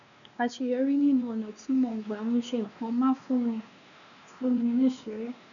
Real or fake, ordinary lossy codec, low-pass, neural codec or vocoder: fake; none; 7.2 kHz; codec, 16 kHz, 2 kbps, X-Codec, WavLM features, trained on Multilingual LibriSpeech